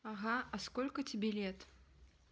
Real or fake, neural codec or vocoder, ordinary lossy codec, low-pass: real; none; none; none